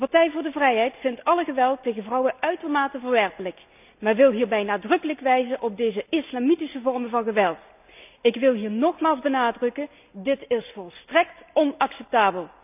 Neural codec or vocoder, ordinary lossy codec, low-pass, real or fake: none; none; 3.6 kHz; real